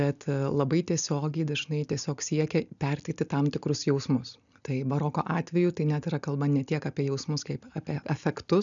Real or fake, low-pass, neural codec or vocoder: real; 7.2 kHz; none